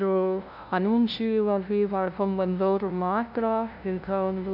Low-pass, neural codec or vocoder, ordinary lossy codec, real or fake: 5.4 kHz; codec, 16 kHz, 0.5 kbps, FunCodec, trained on LibriTTS, 25 frames a second; none; fake